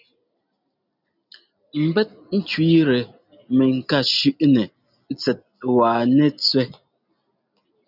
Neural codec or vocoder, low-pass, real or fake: none; 5.4 kHz; real